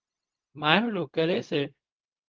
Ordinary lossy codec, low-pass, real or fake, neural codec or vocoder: Opus, 32 kbps; 7.2 kHz; fake; codec, 16 kHz, 0.4 kbps, LongCat-Audio-Codec